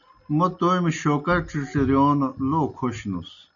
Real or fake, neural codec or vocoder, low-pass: real; none; 7.2 kHz